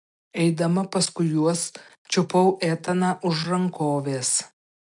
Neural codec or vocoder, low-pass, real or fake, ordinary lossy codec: none; 10.8 kHz; real; MP3, 64 kbps